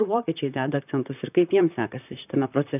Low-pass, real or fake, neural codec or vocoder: 3.6 kHz; fake; vocoder, 44.1 kHz, 128 mel bands, Pupu-Vocoder